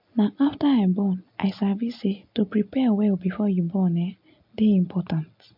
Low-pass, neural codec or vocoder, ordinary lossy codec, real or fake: 5.4 kHz; none; MP3, 48 kbps; real